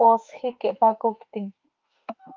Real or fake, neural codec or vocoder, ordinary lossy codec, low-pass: fake; autoencoder, 48 kHz, 32 numbers a frame, DAC-VAE, trained on Japanese speech; Opus, 24 kbps; 7.2 kHz